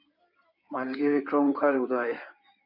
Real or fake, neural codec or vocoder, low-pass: fake; codec, 16 kHz in and 24 kHz out, 2.2 kbps, FireRedTTS-2 codec; 5.4 kHz